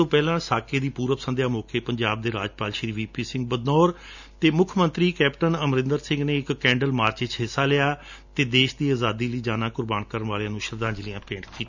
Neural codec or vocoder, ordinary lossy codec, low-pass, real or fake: none; none; 7.2 kHz; real